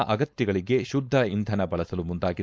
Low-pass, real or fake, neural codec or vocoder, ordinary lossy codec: none; fake; codec, 16 kHz, 4.8 kbps, FACodec; none